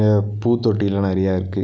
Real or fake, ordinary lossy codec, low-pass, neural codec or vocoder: real; none; none; none